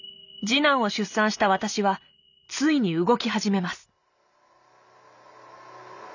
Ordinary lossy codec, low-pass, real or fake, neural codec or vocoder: none; 7.2 kHz; real; none